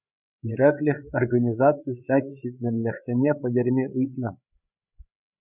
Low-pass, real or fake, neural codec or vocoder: 3.6 kHz; fake; codec, 16 kHz, 8 kbps, FreqCodec, larger model